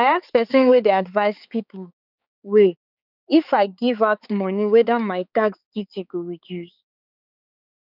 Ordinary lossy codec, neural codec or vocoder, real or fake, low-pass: none; codec, 16 kHz, 4 kbps, X-Codec, HuBERT features, trained on balanced general audio; fake; 5.4 kHz